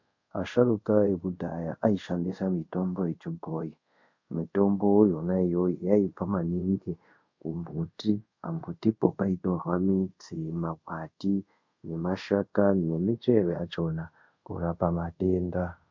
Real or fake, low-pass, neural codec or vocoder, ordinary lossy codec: fake; 7.2 kHz; codec, 24 kHz, 0.5 kbps, DualCodec; MP3, 48 kbps